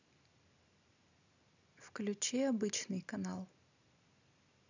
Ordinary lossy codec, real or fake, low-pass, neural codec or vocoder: none; real; 7.2 kHz; none